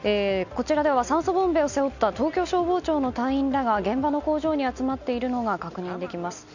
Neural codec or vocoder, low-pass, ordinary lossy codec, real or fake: none; 7.2 kHz; none; real